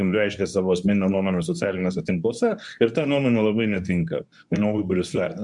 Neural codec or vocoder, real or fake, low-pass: codec, 24 kHz, 0.9 kbps, WavTokenizer, medium speech release version 2; fake; 10.8 kHz